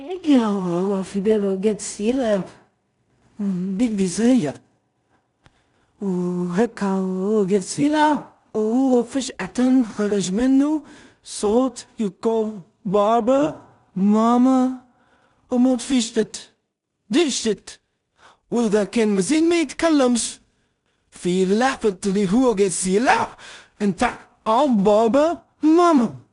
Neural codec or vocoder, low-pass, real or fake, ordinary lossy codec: codec, 16 kHz in and 24 kHz out, 0.4 kbps, LongCat-Audio-Codec, two codebook decoder; 10.8 kHz; fake; none